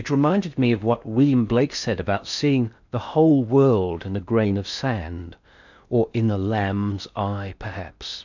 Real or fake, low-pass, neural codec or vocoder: fake; 7.2 kHz; codec, 16 kHz in and 24 kHz out, 0.6 kbps, FocalCodec, streaming, 4096 codes